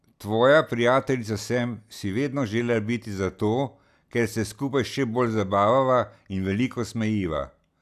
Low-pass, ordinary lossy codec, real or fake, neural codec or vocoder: 14.4 kHz; none; real; none